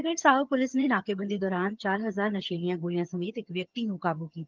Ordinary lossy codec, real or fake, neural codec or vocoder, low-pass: Opus, 24 kbps; fake; vocoder, 22.05 kHz, 80 mel bands, HiFi-GAN; 7.2 kHz